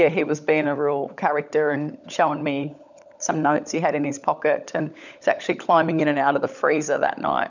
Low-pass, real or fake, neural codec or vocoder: 7.2 kHz; fake; codec, 16 kHz, 16 kbps, FunCodec, trained on LibriTTS, 50 frames a second